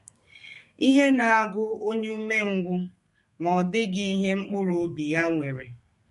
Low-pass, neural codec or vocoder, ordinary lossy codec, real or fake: 14.4 kHz; codec, 32 kHz, 1.9 kbps, SNAC; MP3, 48 kbps; fake